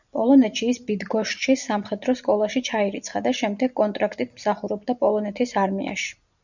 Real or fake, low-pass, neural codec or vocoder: real; 7.2 kHz; none